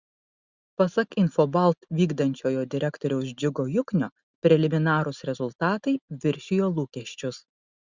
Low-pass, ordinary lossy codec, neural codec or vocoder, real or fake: 7.2 kHz; Opus, 64 kbps; none; real